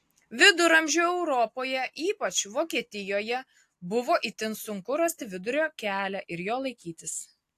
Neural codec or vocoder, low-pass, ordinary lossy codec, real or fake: none; 14.4 kHz; AAC, 64 kbps; real